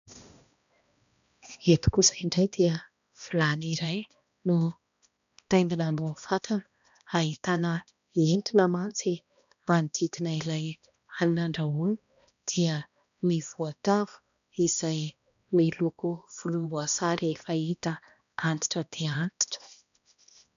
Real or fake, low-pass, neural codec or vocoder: fake; 7.2 kHz; codec, 16 kHz, 1 kbps, X-Codec, HuBERT features, trained on balanced general audio